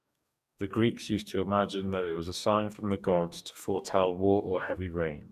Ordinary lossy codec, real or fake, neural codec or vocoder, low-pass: none; fake; codec, 44.1 kHz, 2.6 kbps, DAC; 14.4 kHz